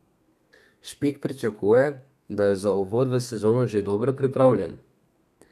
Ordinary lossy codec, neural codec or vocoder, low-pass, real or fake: none; codec, 32 kHz, 1.9 kbps, SNAC; 14.4 kHz; fake